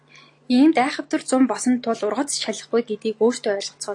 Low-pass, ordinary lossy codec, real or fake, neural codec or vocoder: 10.8 kHz; AAC, 48 kbps; real; none